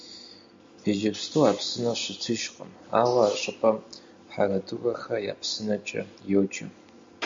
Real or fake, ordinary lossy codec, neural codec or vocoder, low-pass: real; MP3, 48 kbps; none; 7.2 kHz